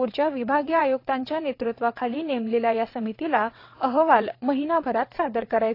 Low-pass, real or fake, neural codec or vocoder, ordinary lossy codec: 5.4 kHz; fake; vocoder, 22.05 kHz, 80 mel bands, WaveNeXt; AAC, 32 kbps